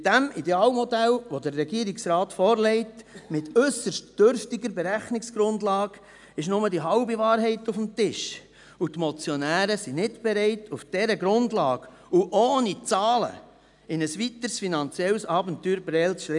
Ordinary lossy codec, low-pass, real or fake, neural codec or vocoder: none; 10.8 kHz; real; none